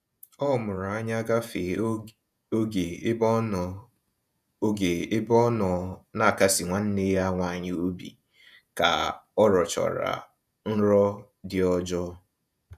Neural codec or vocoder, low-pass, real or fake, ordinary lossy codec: vocoder, 48 kHz, 128 mel bands, Vocos; 14.4 kHz; fake; none